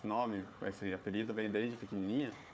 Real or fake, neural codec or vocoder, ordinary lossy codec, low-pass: fake; codec, 16 kHz, 4 kbps, FunCodec, trained on Chinese and English, 50 frames a second; none; none